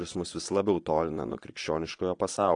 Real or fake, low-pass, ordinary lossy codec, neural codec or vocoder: fake; 9.9 kHz; AAC, 48 kbps; vocoder, 22.05 kHz, 80 mel bands, Vocos